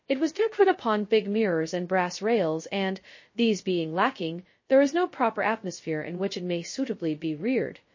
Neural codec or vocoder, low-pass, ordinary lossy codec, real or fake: codec, 16 kHz, 0.2 kbps, FocalCodec; 7.2 kHz; MP3, 32 kbps; fake